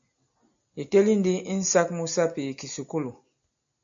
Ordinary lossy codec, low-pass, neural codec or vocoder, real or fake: AAC, 64 kbps; 7.2 kHz; none; real